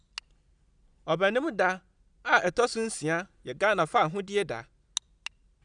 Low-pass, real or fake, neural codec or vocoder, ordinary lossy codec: 9.9 kHz; real; none; none